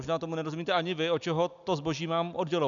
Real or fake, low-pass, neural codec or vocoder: real; 7.2 kHz; none